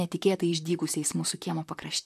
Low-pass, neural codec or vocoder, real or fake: 14.4 kHz; vocoder, 44.1 kHz, 128 mel bands, Pupu-Vocoder; fake